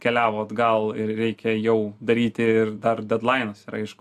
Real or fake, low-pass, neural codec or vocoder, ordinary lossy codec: real; 14.4 kHz; none; AAC, 96 kbps